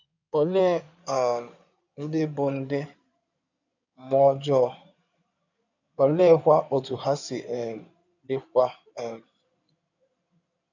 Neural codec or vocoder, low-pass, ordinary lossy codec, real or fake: codec, 16 kHz in and 24 kHz out, 2.2 kbps, FireRedTTS-2 codec; 7.2 kHz; none; fake